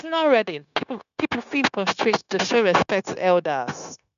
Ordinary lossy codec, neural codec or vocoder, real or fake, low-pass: none; codec, 16 kHz, 0.9 kbps, LongCat-Audio-Codec; fake; 7.2 kHz